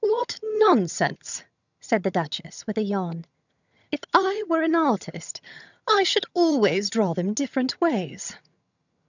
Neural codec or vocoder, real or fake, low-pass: vocoder, 22.05 kHz, 80 mel bands, HiFi-GAN; fake; 7.2 kHz